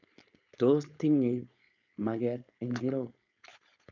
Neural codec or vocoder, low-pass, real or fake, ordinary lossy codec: codec, 16 kHz, 4.8 kbps, FACodec; 7.2 kHz; fake; none